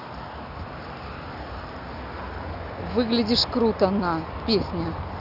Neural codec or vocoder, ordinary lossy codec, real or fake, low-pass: none; none; real; 5.4 kHz